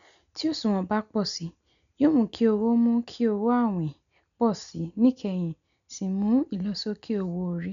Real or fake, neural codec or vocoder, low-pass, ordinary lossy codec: real; none; 7.2 kHz; none